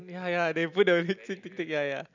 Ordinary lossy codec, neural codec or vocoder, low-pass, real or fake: none; none; 7.2 kHz; real